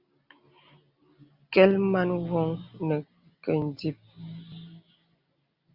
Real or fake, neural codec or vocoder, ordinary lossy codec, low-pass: real; none; Opus, 64 kbps; 5.4 kHz